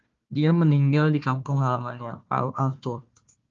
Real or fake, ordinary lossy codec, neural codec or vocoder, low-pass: fake; Opus, 32 kbps; codec, 16 kHz, 1 kbps, FunCodec, trained on Chinese and English, 50 frames a second; 7.2 kHz